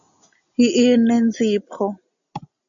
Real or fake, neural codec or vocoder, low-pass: real; none; 7.2 kHz